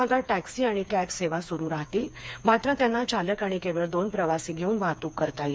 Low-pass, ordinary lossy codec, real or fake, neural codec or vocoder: none; none; fake; codec, 16 kHz, 4 kbps, FreqCodec, smaller model